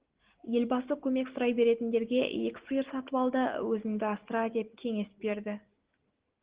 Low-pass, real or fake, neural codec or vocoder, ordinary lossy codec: 3.6 kHz; real; none; Opus, 16 kbps